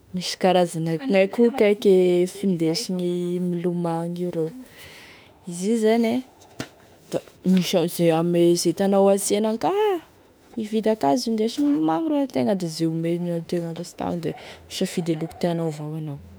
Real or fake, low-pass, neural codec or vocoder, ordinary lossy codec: fake; none; autoencoder, 48 kHz, 32 numbers a frame, DAC-VAE, trained on Japanese speech; none